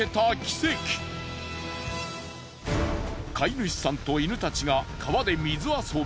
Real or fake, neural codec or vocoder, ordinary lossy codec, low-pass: real; none; none; none